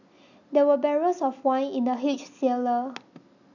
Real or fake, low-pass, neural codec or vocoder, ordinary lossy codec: real; 7.2 kHz; none; none